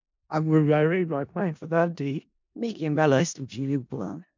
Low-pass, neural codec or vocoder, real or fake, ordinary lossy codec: 7.2 kHz; codec, 16 kHz in and 24 kHz out, 0.4 kbps, LongCat-Audio-Codec, four codebook decoder; fake; MP3, 64 kbps